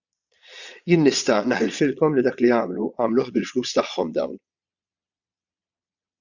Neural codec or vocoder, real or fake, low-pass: vocoder, 22.05 kHz, 80 mel bands, Vocos; fake; 7.2 kHz